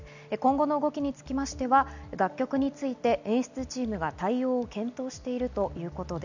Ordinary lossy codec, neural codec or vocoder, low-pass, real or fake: none; none; 7.2 kHz; real